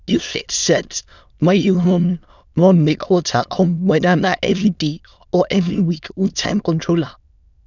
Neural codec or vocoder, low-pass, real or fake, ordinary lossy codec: autoencoder, 22.05 kHz, a latent of 192 numbers a frame, VITS, trained on many speakers; 7.2 kHz; fake; none